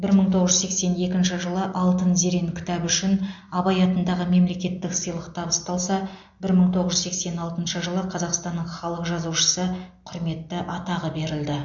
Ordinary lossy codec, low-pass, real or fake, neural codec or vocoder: MP3, 48 kbps; 7.2 kHz; real; none